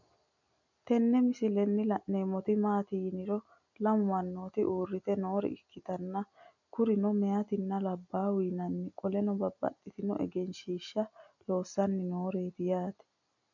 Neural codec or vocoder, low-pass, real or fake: none; 7.2 kHz; real